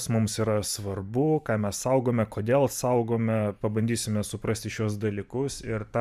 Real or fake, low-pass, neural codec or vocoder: fake; 14.4 kHz; vocoder, 44.1 kHz, 128 mel bands every 512 samples, BigVGAN v2